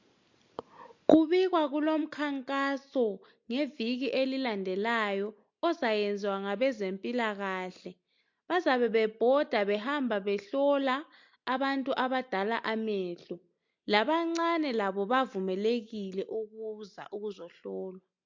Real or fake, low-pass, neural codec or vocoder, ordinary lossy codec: real; 7.2 kHz; none; MP3, 48 kbps